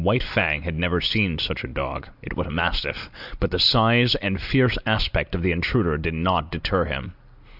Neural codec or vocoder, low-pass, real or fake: none; 5.4 kHz; real